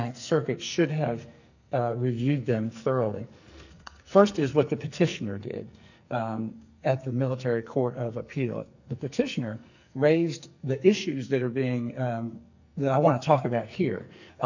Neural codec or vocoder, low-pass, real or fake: codec, 44.1 kHz, 2.6 kbps, SNAC; 7.2 kHz; fake